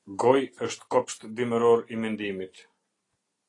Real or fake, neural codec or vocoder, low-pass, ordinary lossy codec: real; none; 10.8 kHz; AAC, 32 kbps